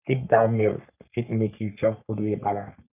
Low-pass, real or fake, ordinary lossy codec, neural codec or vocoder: 3.6 kHz; fake; none; codec, 44.1 kHz, 3.4 kbps, Pupu-Codec